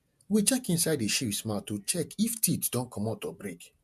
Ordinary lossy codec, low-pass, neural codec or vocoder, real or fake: MP3, 96 kbps; 14.4 kHz; vocoder, 48 kHz, 128 mel bands, Vocos; fake